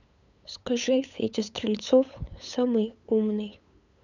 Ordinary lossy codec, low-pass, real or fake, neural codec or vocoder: none; 7.2 kHz; fake; codec, 16 kHz, 8 kbps, FunCodec, trained on LibriTTS, 25 frames a second